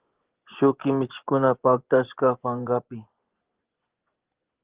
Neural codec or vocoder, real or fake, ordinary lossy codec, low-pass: vocoder, 24 kHz, 100 mel bands, Vocos; fake; Opus, 16 kbps; 3.6 kHz